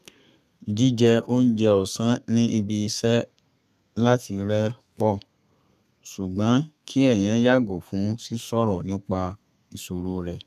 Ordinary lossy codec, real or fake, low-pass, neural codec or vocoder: none; fake; 14.4 kHz; codec, 32 kHz, 1.9 kbps, SNAC